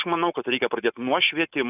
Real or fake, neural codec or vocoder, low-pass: real; none; 3.6 kHz